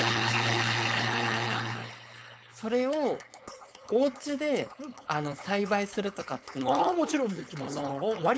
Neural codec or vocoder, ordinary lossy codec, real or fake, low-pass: codec, 16 kHz, 4.8 kbps, FACodec; none; fake; none